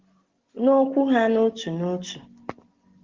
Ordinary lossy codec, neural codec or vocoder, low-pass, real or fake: Opus, 16 kbps; none; 7.2 kHz; real